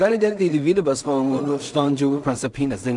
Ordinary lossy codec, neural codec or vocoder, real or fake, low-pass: MP3, 96 kbps; codec, 16 kHz in and 24 kHz out, 0.4 kbps, LongCat-Audio-Codec, two codebook decoder; fake; 10.8 kHz